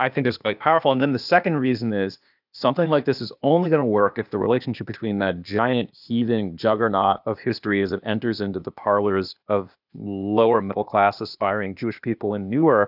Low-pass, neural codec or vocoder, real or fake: 5.4 kHz; codec, 16 kHz, 0.8 kbps, ZipCodec; fake